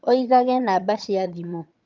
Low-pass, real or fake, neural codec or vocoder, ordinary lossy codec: 7.2 kHz; fake; vocoder, 22.05 kHz, 80 mel bands, HiFi-GAN; Opus, 24 kbps